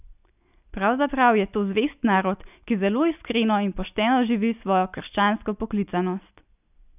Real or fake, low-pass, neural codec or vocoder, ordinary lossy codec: real; 3.6 kHz; none; none